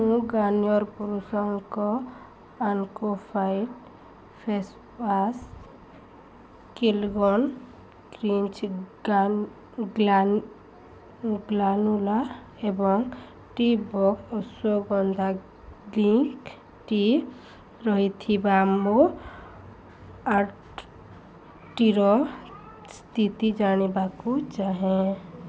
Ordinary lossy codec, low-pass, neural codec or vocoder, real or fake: none; none; none; real